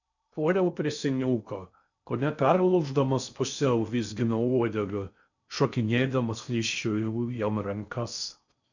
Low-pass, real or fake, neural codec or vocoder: 7.2 kHz; fake; codec, 16 kHz in and 24 kHz out, 0.6 kbps, FocalCodec, streaming, 2048 codes